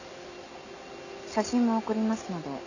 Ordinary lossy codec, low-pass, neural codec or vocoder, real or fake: none; 7.2 kHz; none; real